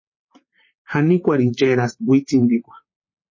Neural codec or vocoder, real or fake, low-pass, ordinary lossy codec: vocoder, 44.1 kHz, 128 mel bands, Pupu-Vocoder; fake; 7.2 kHz; MP3, 32 kbps